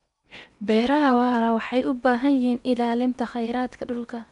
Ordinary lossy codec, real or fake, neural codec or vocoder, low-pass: none; fake; codec, 16 kHz in and 24 kHz out, 0.8 kbps, FocalCodec, streaming, 65536 codes; 10.8 kHz